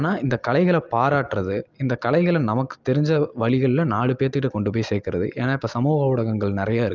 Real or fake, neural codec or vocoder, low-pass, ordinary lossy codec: real; none; 7.2 kHz; Opus, 24 kbps